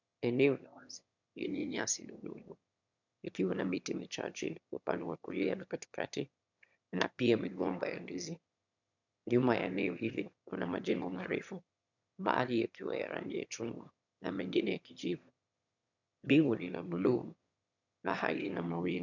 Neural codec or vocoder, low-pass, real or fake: autoencoder, 22.05 kHz, a latent of 192 numbers a frame, VITS, trained on one speaker; 7.2 kHz; fake